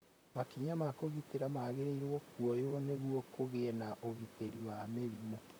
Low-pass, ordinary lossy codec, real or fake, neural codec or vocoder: none; none; fake; vocoder, 44.1 kHz, 128 mel bands, Pupu-Vocoder